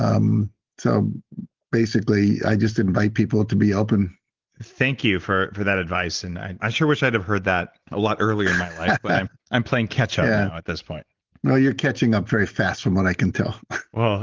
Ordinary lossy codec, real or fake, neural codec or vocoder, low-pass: Opus, 16 kbps; real; none; 7.2 kHz